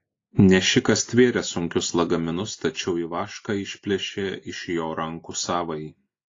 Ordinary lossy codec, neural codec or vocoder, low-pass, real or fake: AAC, 32 kbps; none; 7.2 kHz; real